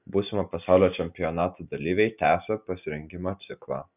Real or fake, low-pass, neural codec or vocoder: real; 3.6 kHz; none